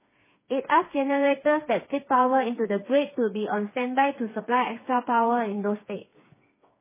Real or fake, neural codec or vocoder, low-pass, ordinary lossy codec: fake; codec, 16 kHz, 4 kbps, FreqCodec, smaller model; 3.6 kHz; MP3, 16 kbps